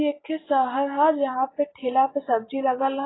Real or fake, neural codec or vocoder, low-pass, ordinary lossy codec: real; none; 7.2 kHz; AAC, 16 kbps